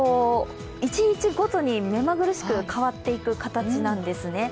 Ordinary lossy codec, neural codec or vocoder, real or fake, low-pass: none; none; real; none